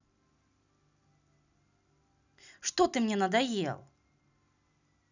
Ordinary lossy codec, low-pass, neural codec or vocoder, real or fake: none; 7.2 kHz; none; real